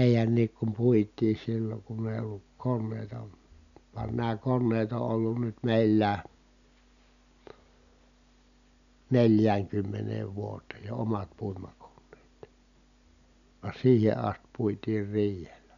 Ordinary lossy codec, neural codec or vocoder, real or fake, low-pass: none; none; real; 7.2 kHz